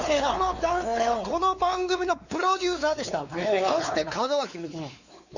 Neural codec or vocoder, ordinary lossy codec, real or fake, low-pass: codec, 16 kHz, 4 kbps, X-Codec, WavLM features, trained on Multilingual LibriSpeech; none; fake; 7.2 kHz